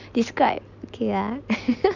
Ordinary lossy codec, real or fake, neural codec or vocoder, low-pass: none; real; none; 7.2 kHz